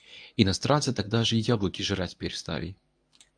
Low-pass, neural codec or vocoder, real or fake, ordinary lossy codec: 9.9 kHz; codec, 24 kHz, 0.9 kbps, WavTokenizer, medium speech release version 1; fake; AAC, 64 kbps